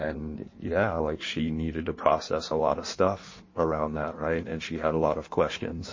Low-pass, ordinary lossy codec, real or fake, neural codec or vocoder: 7.2 kHz; MP3, 32 kbps; fake; codec, 16 kHz in and 24 kHz out, 1.1 kbps, FireRedTTS-2 codec